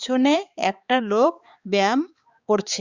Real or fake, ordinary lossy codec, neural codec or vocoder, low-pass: fake; Opus, 64 kbps; codec, 16 kHz, 4 kbps, X-Codec, HuBERT features, trained on balanced general audio; 7.2 kHz